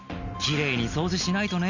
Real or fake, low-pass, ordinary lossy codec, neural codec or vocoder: real; 7.2 kHz; none; none